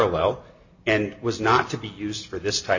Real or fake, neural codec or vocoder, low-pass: real; none; 7.2 kHz